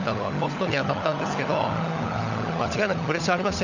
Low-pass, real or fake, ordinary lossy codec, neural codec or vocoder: 7.2 kHz; fake; none; codec, 16 kHz, 16 kbps, FunCodec, trained on LibriTTS, 50 frames a second